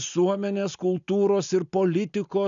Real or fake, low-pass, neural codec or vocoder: real; 7.2 kHz; none